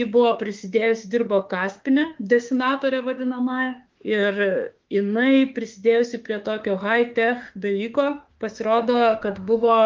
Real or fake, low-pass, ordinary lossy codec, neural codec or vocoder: fake; 7.2 kHz; Opus, 32 kbps; autoencoder, 48 kHz, 32 numbers a frame, DAC-VAE, trained on Japanese speech